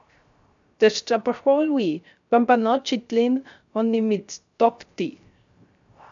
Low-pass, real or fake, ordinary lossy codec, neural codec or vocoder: 7.2 kHz; fake; MP3, 64 kbps; codec, 16 kHz, 0.3 kbps, FocalCodec